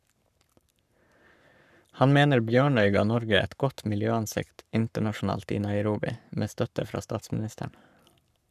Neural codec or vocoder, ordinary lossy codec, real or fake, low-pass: codec, 44.1 kHz, 7.8 kbps, Pupu-Codec; none; fake; 14.4 kHz